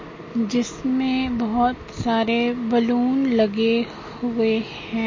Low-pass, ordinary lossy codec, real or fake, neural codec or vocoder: 7.2 kHz; MP3, 32 kbps; real; none